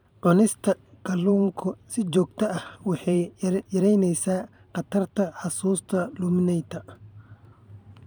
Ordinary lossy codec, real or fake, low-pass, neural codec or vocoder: none; fake; none; vocoder, 44.1 kHz, 128 mel bands every 512 samples, BigVGAN v2